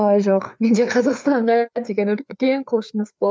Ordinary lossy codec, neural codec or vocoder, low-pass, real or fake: none; codec, 16 kHz, 4 kbps, FreqCodec, larger model; none; fake